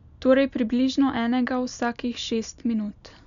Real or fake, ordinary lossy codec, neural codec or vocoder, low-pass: real; none; none; 7.2 kHz